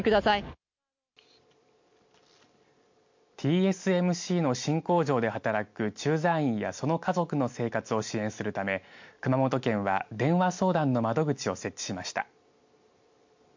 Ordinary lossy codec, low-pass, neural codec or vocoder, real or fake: MP3, 48 kbps; 7.2 kHz; none; real